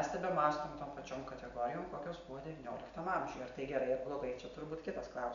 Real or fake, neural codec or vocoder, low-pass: real; none; 7.2 kHz